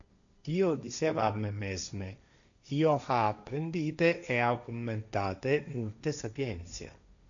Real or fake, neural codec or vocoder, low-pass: fake; codec, 16 kHz, 1.1 kbps, Voila-Tokenizer; 7.2 kHz